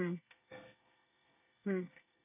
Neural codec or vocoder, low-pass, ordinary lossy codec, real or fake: codec, 24 kHz, 1 kbps, SNAC; 3.6 kHz; none; fake